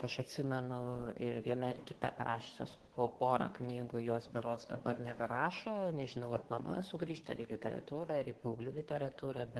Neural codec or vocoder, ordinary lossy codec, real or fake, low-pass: codec, 24 kHz, 1 kbps, SNAC; Opus, 16 kbps; fake; 10.8 kHz